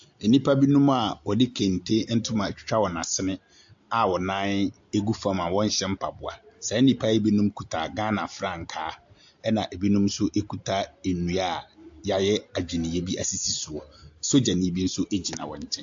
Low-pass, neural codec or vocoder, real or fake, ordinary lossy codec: 7.2 kHz; none; real; AAC, 64 kbps